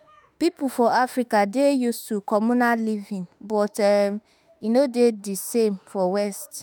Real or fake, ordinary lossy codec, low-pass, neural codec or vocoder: fake; none; none; autoencoder, 48 kHz, 32 numbers a frame, DAC-VAE, trained on Japanese speech